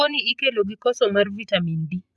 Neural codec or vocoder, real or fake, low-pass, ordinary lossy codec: vocoder, 24 kHz, 100 mel bands, Vocos; fake; none; none